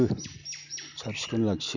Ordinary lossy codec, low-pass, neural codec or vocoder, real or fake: none; 7.2 kHz; none; real